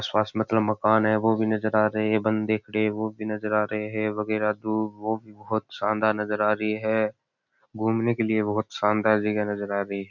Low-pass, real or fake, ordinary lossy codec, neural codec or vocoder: 7.2 kHz; real; none; none